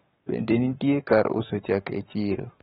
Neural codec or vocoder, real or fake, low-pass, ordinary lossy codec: codec, 44.1 kHz, 7.8 kbps, Pupu-Codec; fake; 19.8 kHz; AAC, 16 kbps